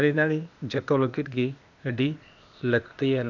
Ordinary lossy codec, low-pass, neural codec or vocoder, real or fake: none; 7.2 kHz; codec, 16 kHz, 0.8 kbps, ZipCodec; fake